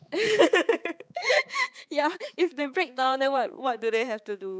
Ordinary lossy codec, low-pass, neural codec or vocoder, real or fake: none; none; codec, 16 kHz, 4 kbps, X-Codec, HuBERT features, trained on balanced general audio; fake